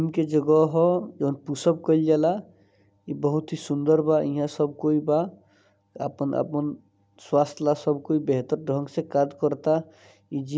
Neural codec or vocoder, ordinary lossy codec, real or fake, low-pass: none; none; real; none